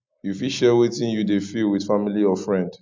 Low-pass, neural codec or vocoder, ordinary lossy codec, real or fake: 7.2 kHz; none; MP3, 64 kbps; real